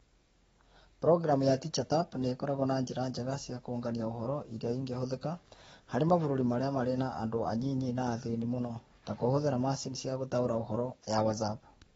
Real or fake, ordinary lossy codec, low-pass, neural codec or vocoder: fake; AAC, 24 kbps; 19.8 kHz; codec, 44.1 kHz, 7.8 kbps, DAC